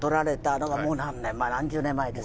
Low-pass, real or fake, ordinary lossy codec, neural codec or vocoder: none; real; none; none